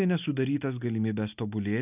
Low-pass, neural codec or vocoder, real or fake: 3.6 kHz; none; real